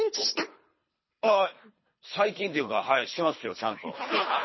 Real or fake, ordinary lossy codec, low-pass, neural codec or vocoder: fake; MP3, 24 kbps; 7.2 kHz; codec, 24 kHz, 3 kbps, HILCodec